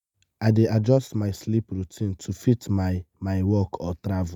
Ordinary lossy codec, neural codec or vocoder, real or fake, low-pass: none; none; real; 19.8 kHz